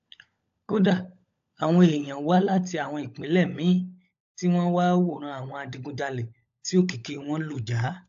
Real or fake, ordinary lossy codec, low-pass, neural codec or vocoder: fake; AAC, 64 kbps; 7.2 kHz; codec, 16 kHz, 16 kbps, FunCodec, trained on LibriTTS, 50 frames a second